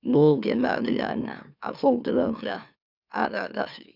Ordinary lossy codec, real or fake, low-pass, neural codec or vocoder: none; fake; 5.4 kHz; autoencoder, 44.1 kHz, a latent of 192 numbers a frame, MeloTTS